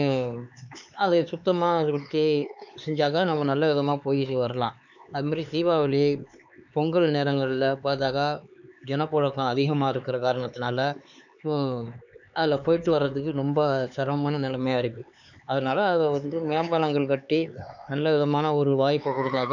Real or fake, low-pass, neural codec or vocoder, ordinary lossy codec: fake; 7.2 kHz; codec, 16 kHz, 4 kbps, X-Codec, HuBERT features, trained on LibriSpeech; none